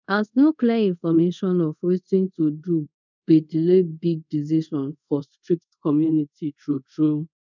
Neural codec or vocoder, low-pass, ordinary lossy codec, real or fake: codec, 24 kHz, 0.5 kbps, DualCodec; 7.2 kHz; none; fake